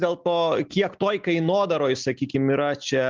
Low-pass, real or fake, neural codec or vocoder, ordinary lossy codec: 7.2 kHz; real; none; Opus, 24 kbps